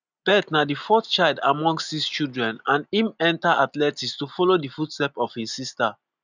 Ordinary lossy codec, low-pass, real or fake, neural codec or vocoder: none; 7.2 kHz; real; none